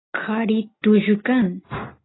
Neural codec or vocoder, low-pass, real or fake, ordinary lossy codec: none; 7.2 kHz; real; AAC, 16 kbps